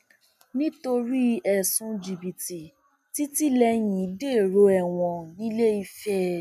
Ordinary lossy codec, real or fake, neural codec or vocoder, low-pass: none; real; none; 14.4 kHz